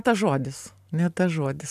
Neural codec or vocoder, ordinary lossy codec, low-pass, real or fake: none; AAC, 96 kbps; 14.4 kHz; real